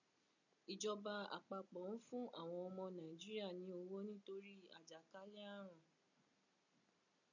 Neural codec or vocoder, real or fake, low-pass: none; real; 7.2 kHz